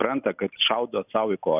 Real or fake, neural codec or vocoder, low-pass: real; none; 3.6 kHz